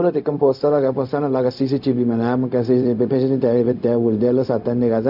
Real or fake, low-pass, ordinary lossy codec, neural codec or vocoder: fake; 5.4 kHz; none; codec, 16 kHz, 0.4 kbps, LongCat-Audio-Codec